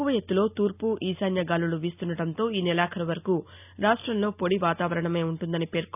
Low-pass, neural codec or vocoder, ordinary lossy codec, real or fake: 3.6 kHz; none; none; real